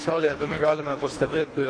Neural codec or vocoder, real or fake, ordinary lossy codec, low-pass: codec, 24 kHz, 1.5 kbps, HILCodec; fake; AAC, 32 kbps; 9.9 kHz